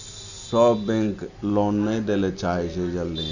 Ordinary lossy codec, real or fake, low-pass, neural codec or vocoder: none; real; 7.2 kHz; none